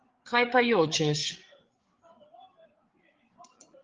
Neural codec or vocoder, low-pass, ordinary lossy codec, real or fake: codec, 16 kHz, 8 kbps, FreqCodec, larger model; 7.2 kHz; Opus, 16 kbps; fake